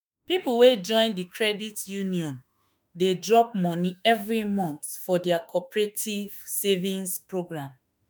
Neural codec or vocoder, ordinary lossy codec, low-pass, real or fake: autoencoder, 48 kHz, 32 numbers a frame, DAC-VAE, trained on Japanese speech; none; none; fake